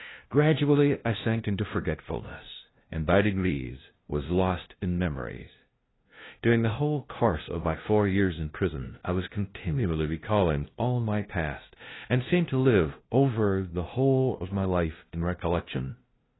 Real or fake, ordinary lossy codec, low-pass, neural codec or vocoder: fake; AAC, 16 kbps; 7.2 kHz; codec, 16 kHz, 0.5 kbps, FunCodec, trained on LibriTTS, 25 frames a second